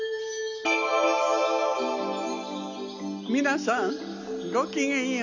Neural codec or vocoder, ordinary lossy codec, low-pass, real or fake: none; none; 7.2 kHz; real